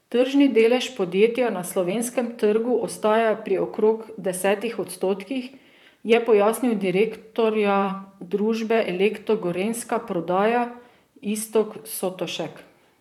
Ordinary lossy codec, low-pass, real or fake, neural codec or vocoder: none; 19.8 kHz; fake; vocoder, 44.1 kHz, 128 mel bands, Pupu-Vocoder